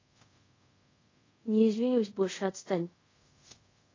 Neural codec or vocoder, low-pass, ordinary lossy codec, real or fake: codec, 24 kHz, 0.5 kbps, DualCodec; 7.2 kHz; AAC, 32 kbps; fake